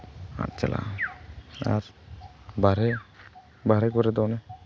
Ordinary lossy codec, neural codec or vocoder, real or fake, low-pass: none; none; real; none